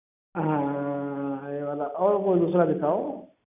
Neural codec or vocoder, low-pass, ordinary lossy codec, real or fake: none; 3.6 kHz; none; real